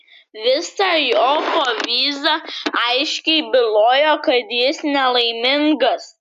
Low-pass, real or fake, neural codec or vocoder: 14.4 kHz; real; none